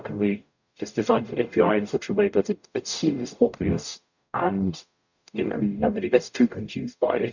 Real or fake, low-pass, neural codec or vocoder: fake; 7.2 kHz; codec, 44.1 kHz, 0.9 kbps, DAC